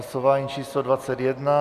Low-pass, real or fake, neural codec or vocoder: 14.4 kHz; real; none